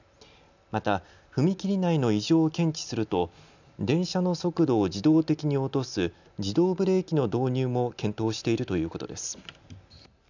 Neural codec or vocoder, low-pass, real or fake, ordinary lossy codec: none; 7.2 kHz; real; none